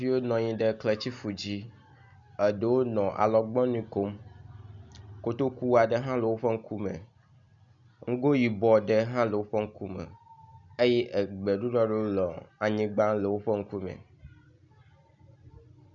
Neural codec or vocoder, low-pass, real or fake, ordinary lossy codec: none; 7.2 kHz; real; MP3, 96 kbps